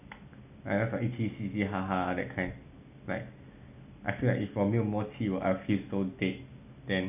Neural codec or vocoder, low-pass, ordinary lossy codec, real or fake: none; 3.6 kHz; none; real